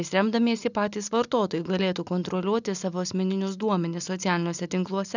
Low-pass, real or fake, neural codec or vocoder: 7.2 kHz; real; none